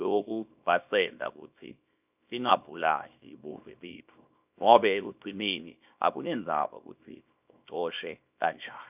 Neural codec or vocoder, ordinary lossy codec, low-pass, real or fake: codec, 24 kHz, 0.9 kbps, WavTokenizer, small release; none; 3.6 kHz; fake